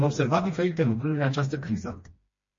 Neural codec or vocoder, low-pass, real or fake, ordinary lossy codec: codec, 16 kHz, 1 kbps, FreqCodec, smaller model; 7.2 kHz; fake; MP3, 32 kbps